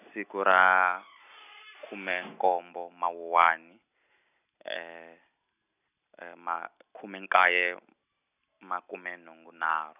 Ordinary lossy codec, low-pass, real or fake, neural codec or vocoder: none; 3.6 kHz; real; none